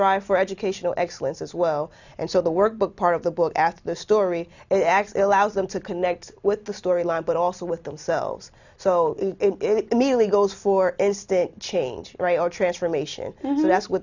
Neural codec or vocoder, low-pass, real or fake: none; 7.2 kHz; real